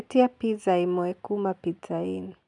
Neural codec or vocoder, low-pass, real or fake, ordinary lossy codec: none; 10.8 kHz; real; none